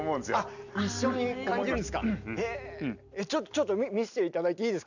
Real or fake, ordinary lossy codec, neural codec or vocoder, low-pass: real; none; none; 7.2 kHz